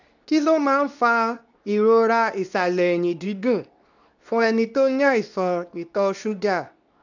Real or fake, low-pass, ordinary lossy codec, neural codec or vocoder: fake; 7.2 kHz; none; codec, 24 kHz, 0.9 kbps, WavTokenizer, medium speech release version 2